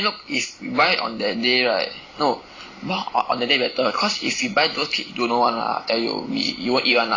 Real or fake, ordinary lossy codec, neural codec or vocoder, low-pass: real; AAC, 32 kbps; none; 7.2 kHz